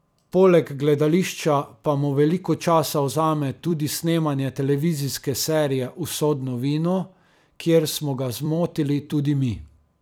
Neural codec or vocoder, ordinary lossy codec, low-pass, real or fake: vocoder, 44.1 kHz, 128 mel bands every 256 samples, BigVGAN v2; none; none; fake